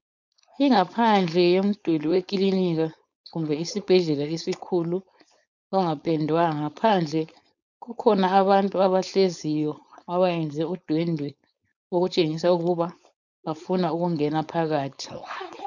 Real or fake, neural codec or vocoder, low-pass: fake; codec, 16 kHz, 4.8 kbps, FACodec; 7.2 kHz